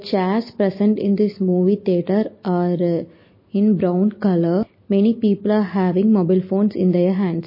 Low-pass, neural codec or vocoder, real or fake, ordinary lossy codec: 5.4 kHz; none; real; MP3, 24 kbps